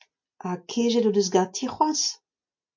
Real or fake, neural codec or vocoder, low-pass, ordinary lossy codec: real; none; 7.2 kHz; MP3, 48 kbps